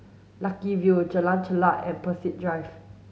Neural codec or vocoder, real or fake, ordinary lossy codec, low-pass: none; real; none; none